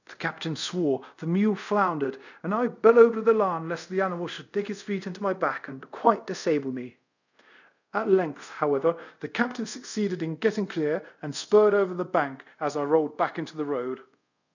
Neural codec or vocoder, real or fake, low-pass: codec, 24 kHz, 0.5 kbps, DualCodec; fake; 7.2 kHz